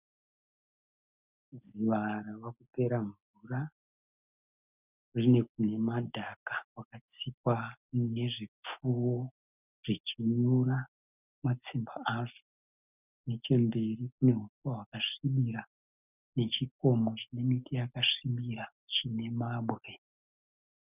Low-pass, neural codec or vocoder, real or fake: 3.6 kHz; none; real